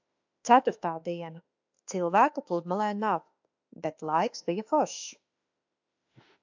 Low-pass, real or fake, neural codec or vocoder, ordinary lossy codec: 7.2 kHz; fake; autoencoder, 48 kHz, 32 numbers a frame, DAC-VAE, trained on Japanese speech; AAC, 48 kbps